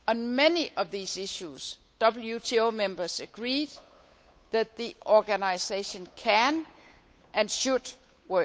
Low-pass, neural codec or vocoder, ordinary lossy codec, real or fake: none; codec, 16 kHz, 8 kbps, FunCodec, trained on Chinese and English, 25 frames a second; none; fake